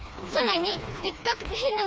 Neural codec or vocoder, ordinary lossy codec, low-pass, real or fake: codec, 16 kHz, 2 kbps, FreqCodec, smaller model; none; none; fake